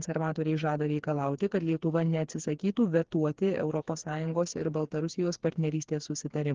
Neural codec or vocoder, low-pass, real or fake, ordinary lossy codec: codec, 16 kHz, 4 kbps, FreqCodec, smaller model; 7.2 kHz; fake; Opus, 16 kbps